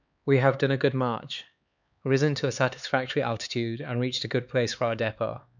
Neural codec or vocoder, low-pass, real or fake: codec, 16 kHz, 4 kbps, X-Codec, HuBERT features, trained on LibriSpeech; 7.2 kHz; fake